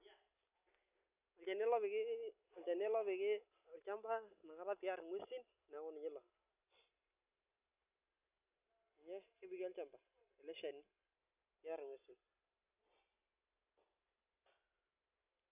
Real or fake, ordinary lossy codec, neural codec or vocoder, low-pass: real; none; none; 3.6 kHz